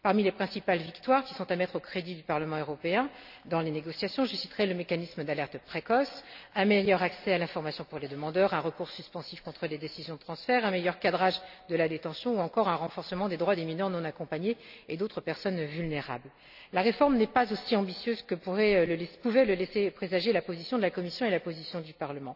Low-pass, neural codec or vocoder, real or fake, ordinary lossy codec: 5.4 kHz; none; real; none